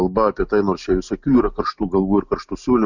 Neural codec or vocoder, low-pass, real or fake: none; 7.2 kHz; real